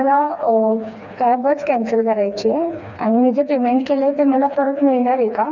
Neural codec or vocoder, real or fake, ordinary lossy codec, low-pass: codec, 16 kHz, 2 kbps, FreqCodec, smaller model; fake; none; 7.2 kHz